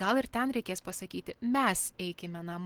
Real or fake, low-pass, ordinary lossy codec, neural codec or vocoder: real; 19.8 kHz; Opus, 16 kbps; none